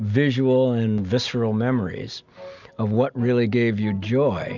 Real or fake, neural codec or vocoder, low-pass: real; none; 7.2 kHz